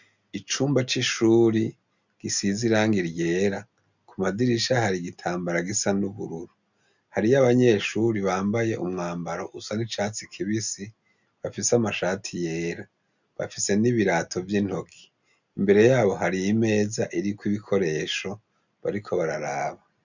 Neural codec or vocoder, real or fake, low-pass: none; real; 7.2 kHz